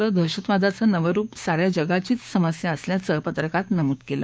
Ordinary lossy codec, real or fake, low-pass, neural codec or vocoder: none; fake; none; codec, 16 kHz, 6 kbps, DAC